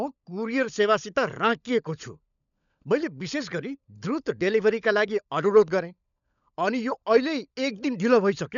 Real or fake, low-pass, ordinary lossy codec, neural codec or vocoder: fake; 7.2 kHz; none; codec, 16 kHz, 16 kbps, FunCodec, trained on LibriTTS, 50 frames a second